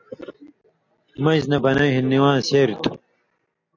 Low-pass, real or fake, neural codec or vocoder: 7.2 kHz; real; none